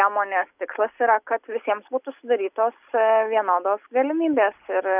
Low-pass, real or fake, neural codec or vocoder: 3.6 kHz; real; none